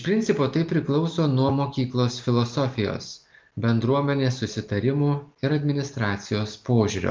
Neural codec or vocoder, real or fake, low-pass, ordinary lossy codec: none; real; 7.2 kHz; Opus, 32 kbps